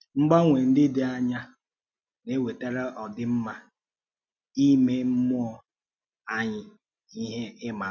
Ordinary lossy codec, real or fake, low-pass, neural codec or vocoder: none; real; 7.2 kHz; none